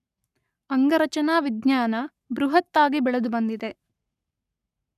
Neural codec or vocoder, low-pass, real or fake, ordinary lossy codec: codec, 44.1 kHz, 7.8 kbps, Pupu-Codec; 14.4 kHz; fake; none